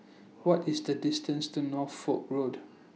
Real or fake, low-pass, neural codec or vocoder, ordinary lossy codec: real; none; none; none